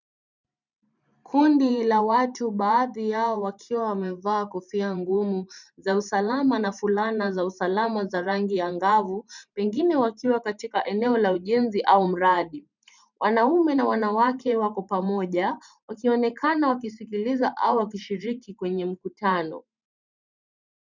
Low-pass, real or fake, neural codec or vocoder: 7.2 kHz; fake; vocoder, 44.1 kHz, 128 mel bands every 512 samples, BigVGAN v2